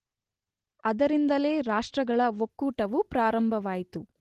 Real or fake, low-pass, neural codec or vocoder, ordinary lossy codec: real; 14.4 kHz; none; Opus, 16 kbps